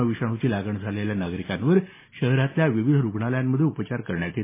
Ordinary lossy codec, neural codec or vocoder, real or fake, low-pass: MP3, 16 kbps; none; real; 3.6 kHz